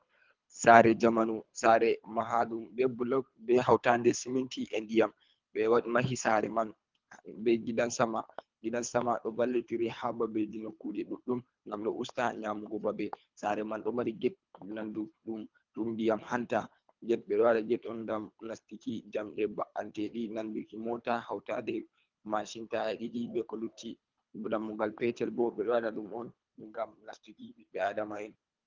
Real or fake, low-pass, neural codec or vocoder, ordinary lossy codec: fake; 7.2 kHz; codec, 24 kHz, 3 kbps, HILCodec; Opus, 32 kbps